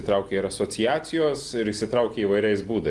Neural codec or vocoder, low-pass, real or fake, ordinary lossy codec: none; 10.8 kHz; real; Opus, 24 kbps